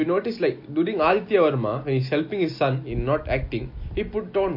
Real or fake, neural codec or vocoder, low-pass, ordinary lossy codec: real; none; 5.4 kHz; MP3, 32 kbps